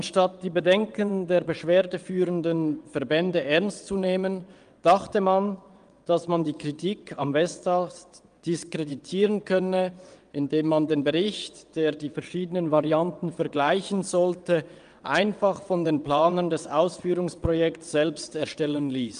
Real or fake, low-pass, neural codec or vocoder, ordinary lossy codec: fake; 9.9 kHz; vocoder, 22.05 kHz, 80 mel bands, WaveNeXt; Opus, 32 kbps